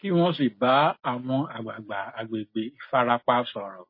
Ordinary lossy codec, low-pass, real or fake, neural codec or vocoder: MP3, 24 kbps; 5.4 kHz; real; none